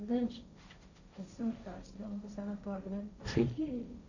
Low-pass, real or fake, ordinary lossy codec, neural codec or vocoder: 7.2 kHz; fake; none; codec, 16 kHz, 1.1 kbps, Voila-Tokenizer